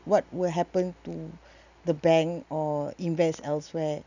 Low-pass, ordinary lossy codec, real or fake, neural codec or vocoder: 7.2 kHz; none; real; none